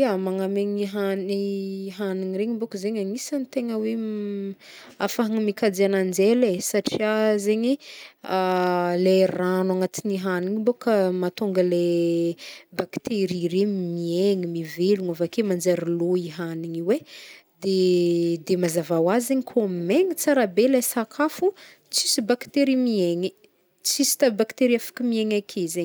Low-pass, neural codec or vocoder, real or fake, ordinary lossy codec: none; none; real; none